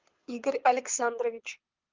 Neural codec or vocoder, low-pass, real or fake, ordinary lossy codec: codec, 24 kHz, 6 kbps, HILCodec; 7.2 kHz; fake; Opus, 24 kbps